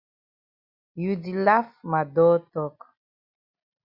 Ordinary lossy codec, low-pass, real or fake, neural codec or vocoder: Opus, 64 kbps; 5.4 kHz; real; none